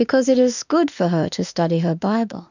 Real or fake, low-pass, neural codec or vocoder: fake; 7.2 kHz; autoencoder, 48 kHz, 32 numbers a frame, DAC-VAE, trained on Japanese speech